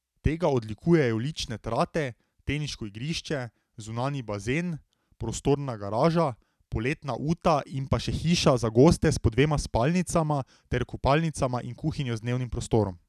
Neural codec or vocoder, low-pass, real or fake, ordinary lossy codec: none; 14.4 kHz; real; none